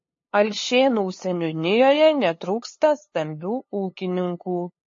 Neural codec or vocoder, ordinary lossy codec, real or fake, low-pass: codec, 16 kHz, 2 kbps, FunCodec, trained on LibriTTS, 25 frames a second; MP3, 32 kbps; fake; 7.2 kHz